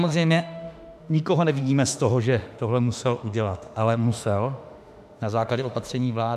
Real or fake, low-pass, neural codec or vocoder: fake; 14.4 kHz; autoencoder, 48 kHz, 32 numbers a frame, DAC-VAE, trained on Japanese speech